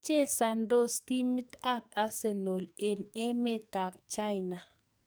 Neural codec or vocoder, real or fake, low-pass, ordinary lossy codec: codec, 44.1 kHz, 2.6 kbps, SNAC; fake; none; none